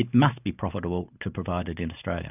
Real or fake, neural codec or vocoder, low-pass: real; none; 3.6 kHz